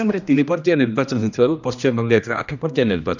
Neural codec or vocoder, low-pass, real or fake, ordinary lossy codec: codec, 16 kHz, 1 kbps, X-Codec, HuBERT features, trained on general audio; 7.2 kHz; fake; none